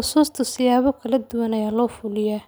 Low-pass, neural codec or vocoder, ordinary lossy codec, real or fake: none; none; none; real